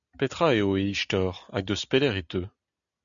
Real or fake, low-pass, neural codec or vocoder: real; 7.2 kHz; none